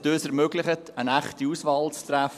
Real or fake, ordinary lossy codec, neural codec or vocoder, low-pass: fake; none; vocoder, 44.1 kHz, 128 mel bands every 256 samples, BigVGAN v2; 14.4 kHz